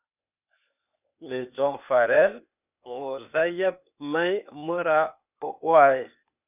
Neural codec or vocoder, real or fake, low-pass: codec, 16 kHz, 0.8 kbps, ZipCodec; fake; 3.6 kHz